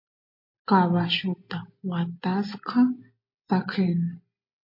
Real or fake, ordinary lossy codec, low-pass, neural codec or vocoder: real; MP3, 32 kbps; 5.4 kHz; none